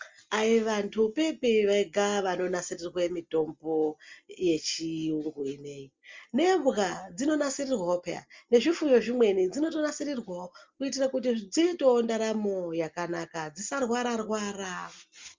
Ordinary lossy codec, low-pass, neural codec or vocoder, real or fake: Opus, 32 kbps; 7.2 kHz; none; real